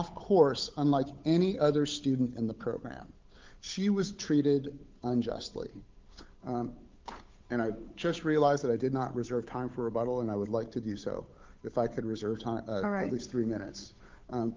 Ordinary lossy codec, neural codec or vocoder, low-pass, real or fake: Opus, 32 kbps; codec, 16 kHz, 8 kbps, FunCodec, trained on Chinese and English, 25 frames a second; 7.2 kHz; fake